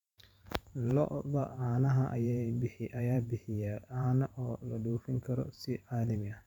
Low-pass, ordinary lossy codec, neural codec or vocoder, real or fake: 19.8 kHz; none; vocoder, 44.1 kHz, 128 mel bands every 512 samples, BigVGAN v2; fake